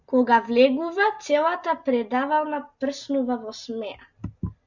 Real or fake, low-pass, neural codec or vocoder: real; 7.2 kHz; none